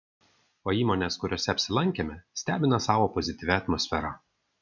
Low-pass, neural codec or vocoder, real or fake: 7.2 kHz; none; real